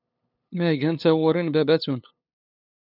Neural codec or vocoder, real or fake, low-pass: codec, 16 kHz, 8 kbps, FunCodec, trained on LibriTTS, 25 frames a second; fake; 5.4 kHz